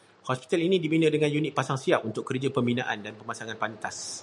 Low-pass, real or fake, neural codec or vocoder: 10.8 kHz; real; none